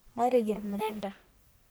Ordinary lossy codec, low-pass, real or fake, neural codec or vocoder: none; none; fake; codec, 44.1 kHz, 1.7 kbps, Pupu-Codec